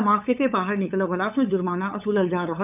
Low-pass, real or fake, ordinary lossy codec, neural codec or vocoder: 3.6 kHz; fake; none; codec, 16 kHz, 8 kbps, FunCodec, trained on LibriTTS, 25 frames a second